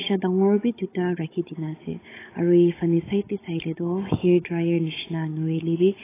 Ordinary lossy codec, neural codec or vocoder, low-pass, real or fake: AAC, 16 kbps; codec, 16 kHz, 16 kbps, FunCodec, trained on Chinese and English, 50 frames a second; 3.6 kHz; fake